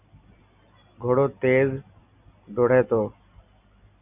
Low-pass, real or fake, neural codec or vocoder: 3.6 kHz; real; none